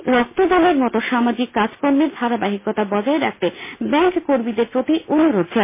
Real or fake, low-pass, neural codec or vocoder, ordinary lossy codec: real; 3.6 kHz; none; MP3, 24 kbps